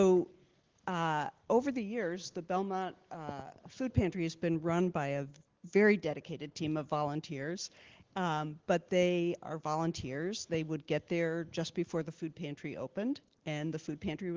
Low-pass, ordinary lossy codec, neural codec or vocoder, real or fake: 7.2 kHz; Opus, 16 kbps; none; real